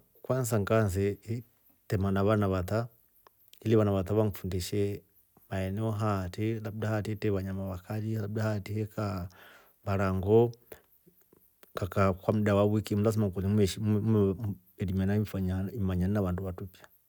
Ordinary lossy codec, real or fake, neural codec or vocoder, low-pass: none; real; none; none